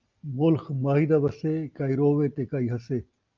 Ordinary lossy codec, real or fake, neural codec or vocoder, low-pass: Opus, 24 kbps; real; none; 7.2 kHz